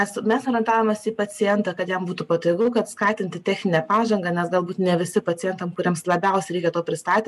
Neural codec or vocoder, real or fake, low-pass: none; real; 14.4 kHz